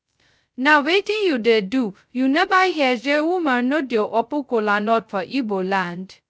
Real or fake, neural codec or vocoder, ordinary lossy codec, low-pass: fake; codec, 16 kHz, 0.2 kbps, FocalCodec; none; none